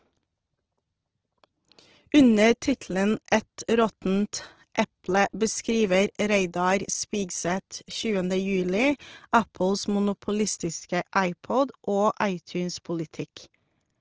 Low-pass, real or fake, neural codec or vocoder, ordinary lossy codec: 7.2 kHz; real; none; Opus, 16 kbps